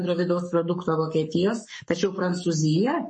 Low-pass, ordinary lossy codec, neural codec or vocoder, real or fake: 10.8 kHz; MP3, 32 kbps; codec, 44.1 kHz, 7.8 kbps, Pupu-Codec; fake